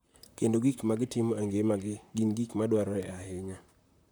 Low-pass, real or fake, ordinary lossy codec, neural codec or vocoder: none; fake; none; vocoder, 44.1 kHz, 128 mel bands, Pupu-Vocoder